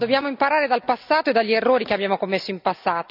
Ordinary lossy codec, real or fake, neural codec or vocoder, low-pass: none; real; none; 5.4 kHz